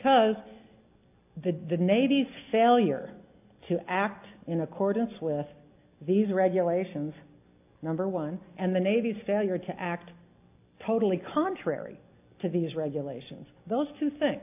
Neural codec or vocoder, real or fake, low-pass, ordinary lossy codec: none; real; 3.6 kHz; AAC, 32 kbps